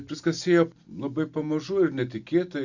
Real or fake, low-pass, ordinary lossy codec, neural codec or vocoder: real; 7.2 kHz; Opus, 64 kbps; none